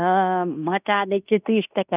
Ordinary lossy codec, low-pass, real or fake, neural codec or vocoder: none; 3.6 kHz; fake; codec, 16 kHz in and 24 kHz out, 0.9 kbps, LongCat-Audio-Codec, fine tuned four codebook decoder